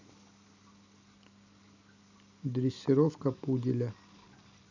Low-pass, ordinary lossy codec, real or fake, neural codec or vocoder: 7.2 kHz; none; real; none